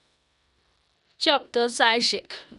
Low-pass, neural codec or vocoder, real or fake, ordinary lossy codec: 10.8 kHz; codec, 16 kHz in and 24 kHz out, 0.9 kbps, LongCat-Audio-Codec, four codebook decoder; fake; none